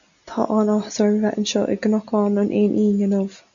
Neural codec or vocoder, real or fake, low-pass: none; real; 7.2 kHz